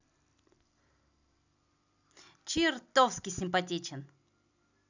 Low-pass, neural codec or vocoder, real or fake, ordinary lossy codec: 7.2 kHz; none; real; none